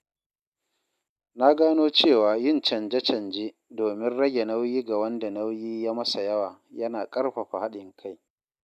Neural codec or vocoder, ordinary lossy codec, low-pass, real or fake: none; none; 14.4 kHz; real